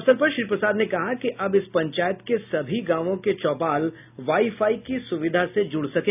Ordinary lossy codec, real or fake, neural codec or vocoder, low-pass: none; real; none; 3.6 kHz